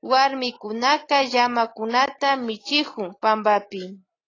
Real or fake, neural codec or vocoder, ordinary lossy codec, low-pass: real; none; AAC, 32 kbps; 7.2 kHz